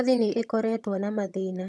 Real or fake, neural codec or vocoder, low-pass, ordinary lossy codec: fake; vocoder, 22.05 kHz, 80 mel bands, HiFi-GAN; none; none